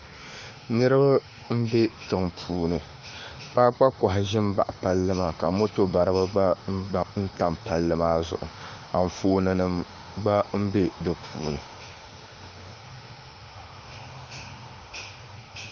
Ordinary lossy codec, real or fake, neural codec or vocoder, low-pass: Opus, 32 kbps; fake; autoencoder, 48 kHz, 32 numbers a frame, DAC-VAE, trained on Japanese speech; 7.2 kHz